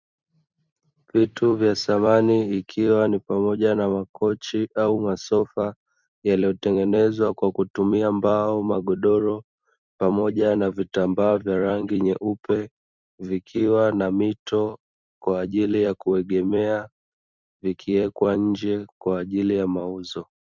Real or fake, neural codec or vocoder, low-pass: fake; vocoder, 44.1 kHz, 128 mel bands every 256 samples, BigVGAN v2; 7.2 kHz